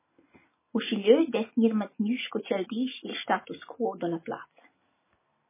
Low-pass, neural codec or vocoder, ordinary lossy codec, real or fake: 3.6 kHz; none; MP3, 16 kbps; real